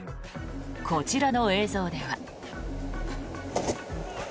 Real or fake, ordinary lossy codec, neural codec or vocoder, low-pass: real; none; none; none